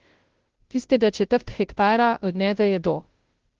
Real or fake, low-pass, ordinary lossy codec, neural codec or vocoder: fake; 7.2 kHz; Opus, 16 kbps; codec, 16 kHz, 0.5 kbps, FunCodec, trained on Chinese and English, 25 frames a second